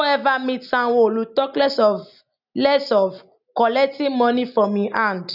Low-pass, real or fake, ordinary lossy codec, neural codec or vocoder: 5.4 kHz; real; none; none